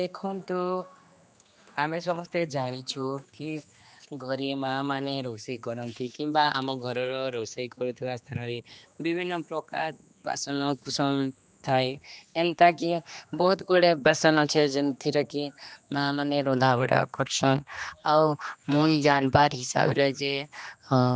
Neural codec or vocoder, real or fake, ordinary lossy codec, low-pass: codec, 16 kHz, 2 kbps, X-Codec, HuBERT features, trained on general audio; fake; none; none